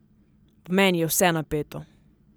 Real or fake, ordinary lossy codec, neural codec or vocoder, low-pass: real; none; none; none